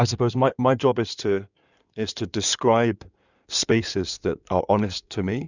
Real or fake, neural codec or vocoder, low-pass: fake; codec, 16 kHz in and 24 kHz out, 2.2 kbps, FireRedTTS-2 codec; 7.2 kHz